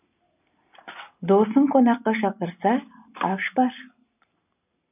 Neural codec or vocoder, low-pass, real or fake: none; 3.6 kHz; real